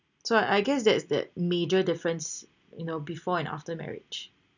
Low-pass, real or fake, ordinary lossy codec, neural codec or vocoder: 7.2 kHz; real; none; none